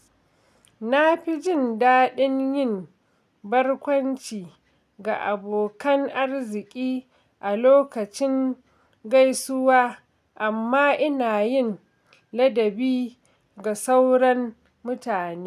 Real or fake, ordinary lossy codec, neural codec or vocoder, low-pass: real; none; none; 14.4 kHz